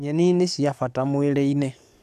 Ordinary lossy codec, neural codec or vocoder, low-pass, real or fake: none; codec, 44.1 kHz, 7.8 kbps, DAC; 14.4 kHz; fake